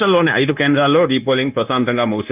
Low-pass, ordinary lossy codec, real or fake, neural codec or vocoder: 3.6 kHz; Opus, 24 kbps; fake; codec, 16 kHz, 0.9 kbps, LongCat-Audio-Codec